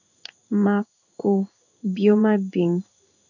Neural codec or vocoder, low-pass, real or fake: codec, 16 kHz in and 24 kHz out, 1 kbps, XY-Tokenizer; 7.2 kHz; fake